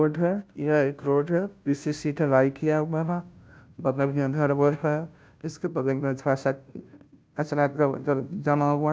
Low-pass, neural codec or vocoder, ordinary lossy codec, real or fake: none; codec, 16 kHz, 0.5 kbps, FunCodec, trained on Chinese and English, 25 frames a second; none; fake